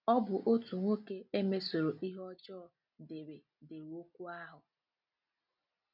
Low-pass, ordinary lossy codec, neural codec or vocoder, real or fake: 5.4 kHz; none; none; real